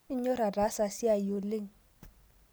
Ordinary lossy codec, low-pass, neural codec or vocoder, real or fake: none; none; none; real